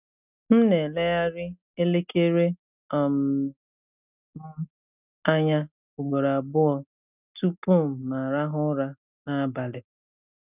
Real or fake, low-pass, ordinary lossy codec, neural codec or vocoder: real; 3.6 kHz; none; none